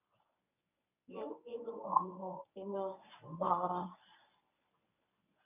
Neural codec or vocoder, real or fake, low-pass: codec, 24 kHz, 0.9 kbps, WavTokenizer, medium speech release version 1; fake; 3.6 kHz